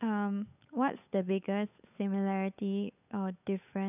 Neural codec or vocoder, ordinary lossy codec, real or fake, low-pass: codec, 16 kHz, 8 kbps, FunCodec, trained on Chinese and English, 25 frames a second; none; fake; 3.6 kHz